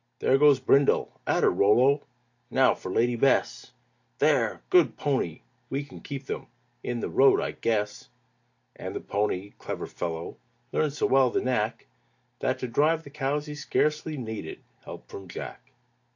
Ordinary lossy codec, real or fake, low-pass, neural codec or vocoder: AAC, 48 kbps; real; 7.2 kHz; none